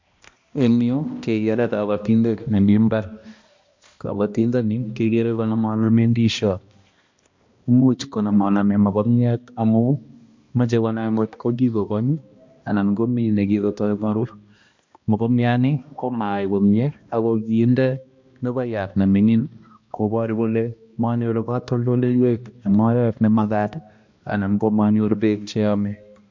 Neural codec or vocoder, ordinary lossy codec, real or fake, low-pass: codec, 16 kHz, 1 kbps, X-Codec, HuBERT features, trained on balanced general audio; MP3, 48 kbps; fake; 7.2 kHz